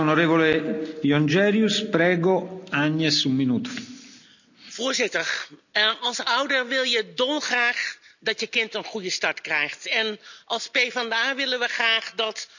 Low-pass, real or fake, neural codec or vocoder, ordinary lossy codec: 7.2 kHz; real; none; none